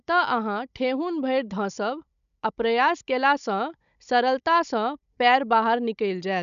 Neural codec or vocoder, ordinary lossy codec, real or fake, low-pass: codec, 16 kHz, 16 kbps, FunCodec, trained on Chinese and English, 50 frames a second; none; fake; 7.2 kHz